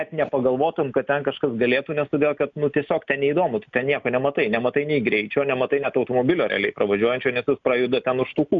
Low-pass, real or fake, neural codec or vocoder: 7.2 kHz; real; none